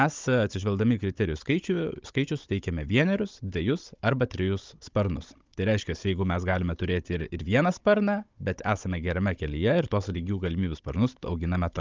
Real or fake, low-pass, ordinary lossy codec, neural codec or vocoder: fake; 7.2 kHz; Opus, 24 kbps; codec, 16 kHz, 16 kbps, FunCodec, trained on Chinese and English, 50 frames a second